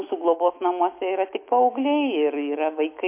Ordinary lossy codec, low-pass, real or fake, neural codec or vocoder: MP3, 24 kbps; 3.6 kHz; fake; autoencoder, 48 kHz, 128 numbers a frame, DAC-VAE, trained on Japanese speech